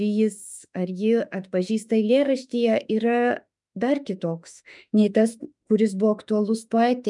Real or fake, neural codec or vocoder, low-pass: fake; autoencoder, 48 kHz, 32 numbers a frame, DAC-VAE, trained on Japanese speech; 10.8 kHz